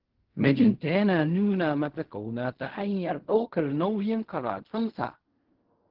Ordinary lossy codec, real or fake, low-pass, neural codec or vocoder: Opus, 16 kbps; fake; 5.4 kHz; codec, 16 kHz in and 24 kHz out, 0.4 kbps, LongCat-Audio-Codec, fine tuned four codebook decoder